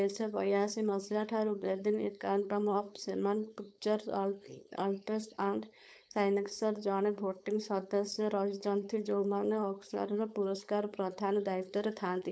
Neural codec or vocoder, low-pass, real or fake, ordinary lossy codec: codec, 16 kHz, 4.8 kbps, FACodec; none; fake; none